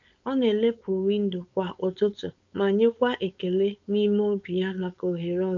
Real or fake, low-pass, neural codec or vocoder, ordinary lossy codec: fake; 7.2 kHz; codec, 16 kHz, 4.8 kbps, FACodec; none